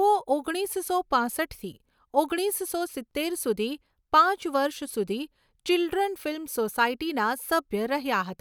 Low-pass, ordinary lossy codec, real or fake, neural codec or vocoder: none; none; real; none